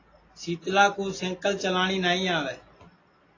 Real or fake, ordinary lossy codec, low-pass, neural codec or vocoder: real; AAC, 32 kbps; 7.2 kHz; none